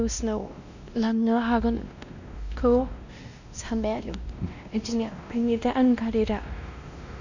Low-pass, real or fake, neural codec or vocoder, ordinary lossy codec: 7.2 kHz; fake; codec, 16 kHz, 1 kbps, X-Codec, WavLM features, trained on Multilingual LibriSpeech; none